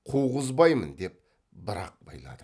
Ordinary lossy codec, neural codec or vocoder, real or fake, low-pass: none; none; real; none